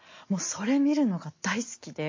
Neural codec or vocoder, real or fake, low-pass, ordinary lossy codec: none; real; 7.2 kHz; MP3, 32 kbps